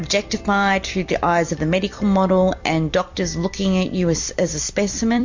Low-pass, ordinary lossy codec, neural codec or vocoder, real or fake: 7.2 kHz; MP3, 48 kbps; vocoder, 44.1 kHz, 128 mel bands every 256 samples, BigVGAN v2; fake